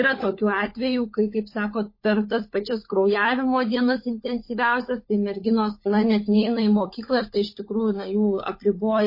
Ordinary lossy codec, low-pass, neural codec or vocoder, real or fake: MP3, 24 kbps; 5.4 kHz; codec, 16 kHz, 16 kbps, FunCodec, trained on LibriTTS, 50 frames a second; fake